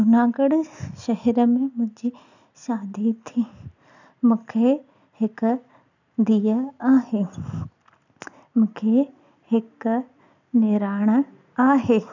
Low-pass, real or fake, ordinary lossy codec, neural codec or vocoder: 7.2 kHz; real; none; none